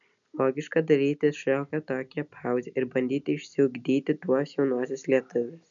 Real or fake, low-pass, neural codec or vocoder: real; 7.2 kHz; none